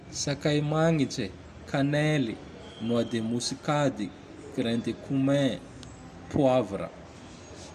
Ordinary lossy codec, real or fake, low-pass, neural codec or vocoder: none; real; 14.4 kHz; none